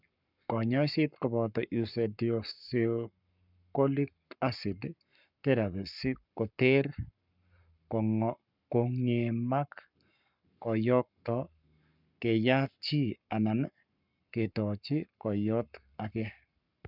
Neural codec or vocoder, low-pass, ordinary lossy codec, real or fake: codec, 44.1 kHz, 7.8 kbps, Pupu-Codec; 5.4 kHz; none; fake